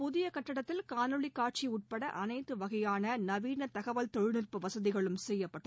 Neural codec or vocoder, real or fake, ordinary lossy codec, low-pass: none; real; none; none